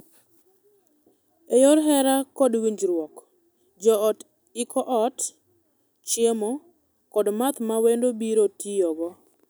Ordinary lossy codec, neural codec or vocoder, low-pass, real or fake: none; none; none; real